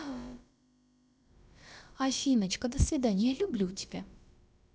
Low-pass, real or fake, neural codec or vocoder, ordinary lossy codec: none; fake; codec, 16 kHz, about 1 kbps, DyCAST, with the encoder's durations; none